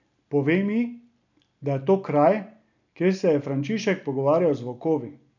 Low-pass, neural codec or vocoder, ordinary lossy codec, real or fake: 7.2 kHz; none; none; real